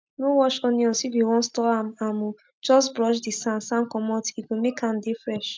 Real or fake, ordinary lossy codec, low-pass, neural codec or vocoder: real; none; none; none